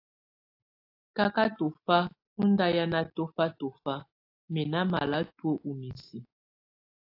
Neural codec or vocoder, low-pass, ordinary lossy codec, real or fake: none; 5.4 kHz; AAC, 32 kbps; real